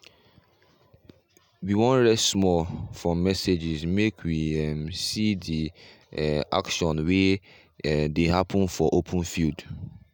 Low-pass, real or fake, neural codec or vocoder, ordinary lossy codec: none; real; none; none